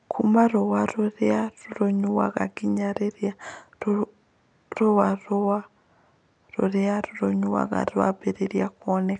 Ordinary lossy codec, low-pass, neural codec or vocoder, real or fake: none; 9.9 kHz; none; real